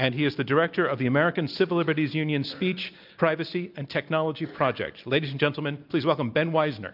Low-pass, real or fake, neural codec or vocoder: 5.4 kHz; real; none